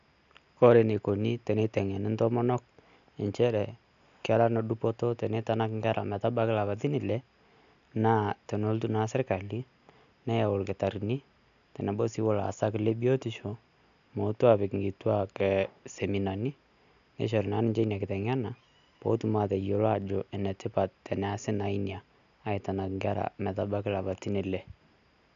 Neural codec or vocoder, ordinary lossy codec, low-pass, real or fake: none; none; 7.2 kHz; real